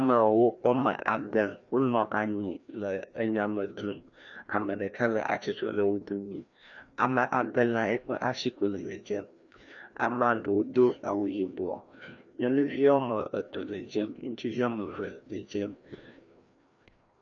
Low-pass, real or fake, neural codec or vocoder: 7.2 kHz; fake; codec, 16 kHz, 1 kbps, FreqCodec, larger model